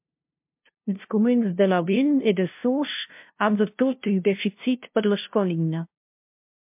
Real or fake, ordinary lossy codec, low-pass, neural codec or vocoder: fake; MP3, 32 kbps; 3.6 kHz; codec, 16 kHz, 0.5 kbps, FunCodec, trained on LibriTTS, 25 frames a second